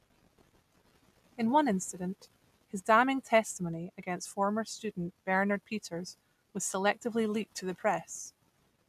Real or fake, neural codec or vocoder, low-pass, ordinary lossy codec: real; none; 14.4 kHz; none